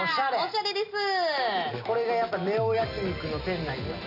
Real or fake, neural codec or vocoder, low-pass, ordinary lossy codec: real; none; 5.4 kHz; none